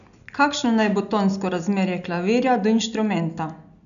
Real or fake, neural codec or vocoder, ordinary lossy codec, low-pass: real; none; none; 7.2 kHz